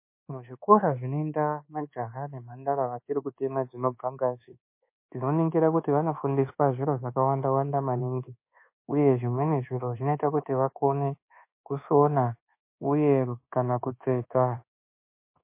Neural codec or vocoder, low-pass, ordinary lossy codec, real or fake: codec, 24 kHz, 1.2 kbps, DualCodec; 3.6 kHz; MP3, 24 kbps; fake